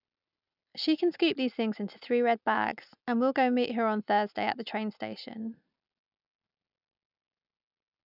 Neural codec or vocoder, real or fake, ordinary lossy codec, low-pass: none; real; none; 5.4 kHz